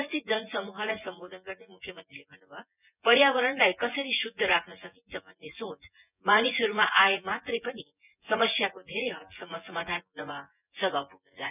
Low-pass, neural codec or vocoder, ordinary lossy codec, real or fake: 3.6 kHz; vocoder, 24 kHz, 100 mel bands, Vocos; none; fake